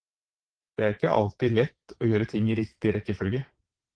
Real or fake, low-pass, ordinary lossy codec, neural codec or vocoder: fake; 9.9 kHz; Opus, 16 kbps; vocoder, 22.05 kHz, 80 mel bands, WaveNeXt